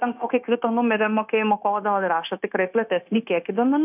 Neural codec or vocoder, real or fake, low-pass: codec, 16 kHz, 0.9 kbps, LongCat-Audio-Codec; fake; 3.6 kHz